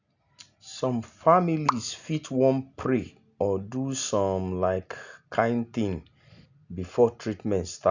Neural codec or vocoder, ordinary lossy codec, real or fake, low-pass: none; none; real; 7.2 kHz